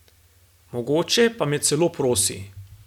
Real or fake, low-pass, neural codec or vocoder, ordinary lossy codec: real; 19.8 kHz; none; none